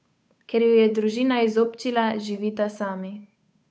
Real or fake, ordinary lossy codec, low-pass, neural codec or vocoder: fake; none; none; codec, 16 kHz, 8 kbps, FunCodec, trained on Chinese and English, 25 frames a second